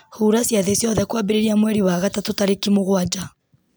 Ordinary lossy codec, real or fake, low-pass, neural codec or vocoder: none; real; none; none